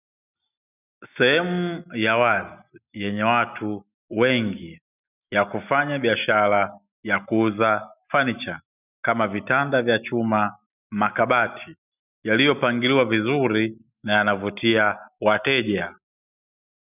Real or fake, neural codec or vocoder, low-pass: real; none; 3.6 kHz